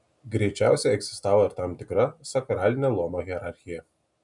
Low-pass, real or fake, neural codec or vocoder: 10.8 kHz; real; none